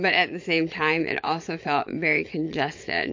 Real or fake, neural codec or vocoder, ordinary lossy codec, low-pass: fake; vocoder, 22.05 kHz, 80 mel bands, Vocos; MP3, 48 kbps; 7.2 kHz